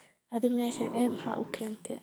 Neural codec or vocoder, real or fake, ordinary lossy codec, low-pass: codec, 44.1 kHz, 2.6 kbps, SNAC; fake; none; none